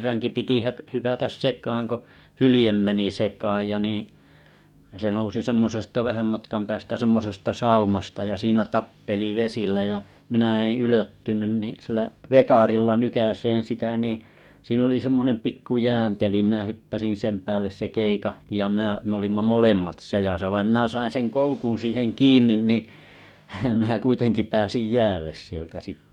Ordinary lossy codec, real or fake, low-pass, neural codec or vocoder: none; fake; 19.8 kHz; codec, 44.1 kHz, 2.6 kbps, DAC